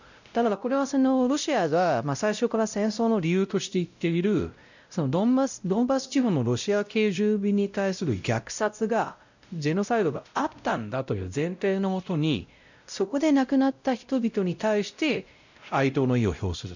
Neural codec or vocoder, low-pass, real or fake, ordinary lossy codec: codec, 16 kHz, 0.5 kbps, X-Codec, WavLM features, trained on Multilingual LibriSpeech; 7.2 kHz; fake; none